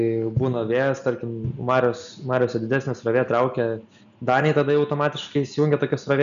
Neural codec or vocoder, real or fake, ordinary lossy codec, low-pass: none; real; MP3, 96 kbps; 7.2 kHz